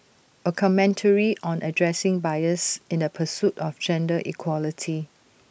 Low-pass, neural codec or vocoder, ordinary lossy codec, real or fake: none; none; none; real